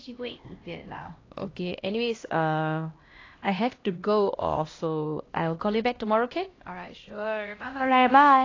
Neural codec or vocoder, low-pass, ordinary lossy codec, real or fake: codec, 16 kHz, 1 kbps, X-Codec, HuBERT features, trained on LibriSpeech; 7.2 kHz; AAC, 32 kbps; fake